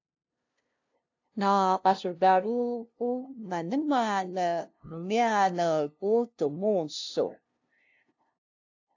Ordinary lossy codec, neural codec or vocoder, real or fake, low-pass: AAC, 48 kbps; codec, 16 kHz, 0.5 kbps, FunCodec, trained on LibriTTS, 25 frames a second; fake; 7.2 kHz